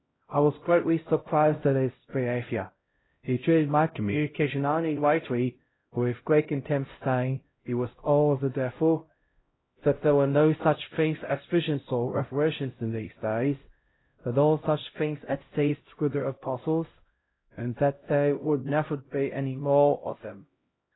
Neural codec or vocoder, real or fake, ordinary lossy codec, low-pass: codec, 16 kHz, 0.5 kbps, X-Codec, HuBERT features, trained on LibriSpeech; fake; AAC, 16 kbps; 7.2 kHz